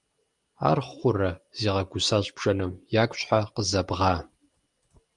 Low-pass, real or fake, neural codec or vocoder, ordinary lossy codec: 10.8 kHz; real; none; Opus, 32 kbps